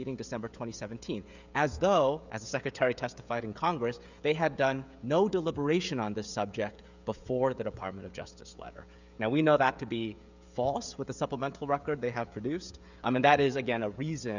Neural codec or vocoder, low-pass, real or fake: codec, 16 kHz, 16 kbps, FreqCodec, smaller model; 7.2 kHz; fake